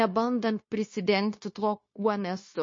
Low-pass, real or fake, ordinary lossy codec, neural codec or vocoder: 7.2 kHz; fake; MP3, 32 kbps; codec, 16 kHz, 0.9 kbps, LongCat-Audio-Codec